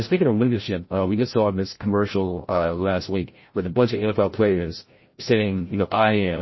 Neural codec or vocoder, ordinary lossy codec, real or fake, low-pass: codec, 16 kHz, 0.5 kbps, FreqCodec, larger model; MP3, 24 kbps; fake; 7.2 kHz